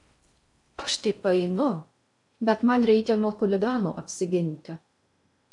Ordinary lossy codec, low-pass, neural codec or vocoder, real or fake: AAC, 64 kbps; 10.8 kHz; codec, 16 kHz in and 24 kHz out, 0.6 kbps, FocalCodec, streaming, 4096 codes; fake